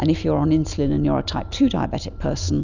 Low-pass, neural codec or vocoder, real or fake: 7.2 kHz; none; real